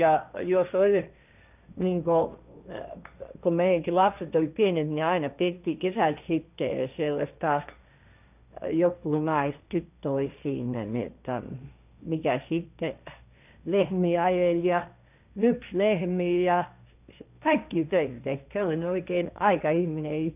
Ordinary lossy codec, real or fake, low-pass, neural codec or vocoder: none; fake; 3.6 kHz; codec, 16 kHz, 1.1 kbps, Voila-Tokenizer